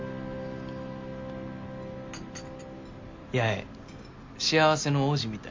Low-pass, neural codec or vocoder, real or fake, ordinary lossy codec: 7.2 kHz; none; real; MP3, 64 kbps